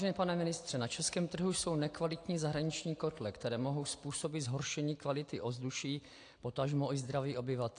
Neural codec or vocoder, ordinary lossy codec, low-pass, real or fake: none; AAC, 48 kbps; 9.9 kHz; real